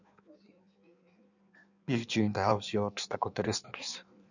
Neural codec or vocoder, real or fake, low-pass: codec, 16 kHz in and 24 kHz out, 1.1 kbps, FireRedTTS-2 codec; fake; 7.2 kHz